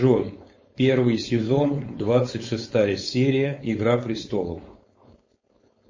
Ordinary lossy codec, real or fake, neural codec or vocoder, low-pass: MP3, 32 kbps; fake; codec, 16 kHz, 4.8 kbps, FACodec; 7.2 kHz